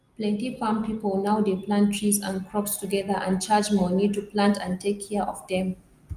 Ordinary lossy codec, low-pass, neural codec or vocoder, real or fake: Opus, 32 kbps; 14.4 kHz; none; real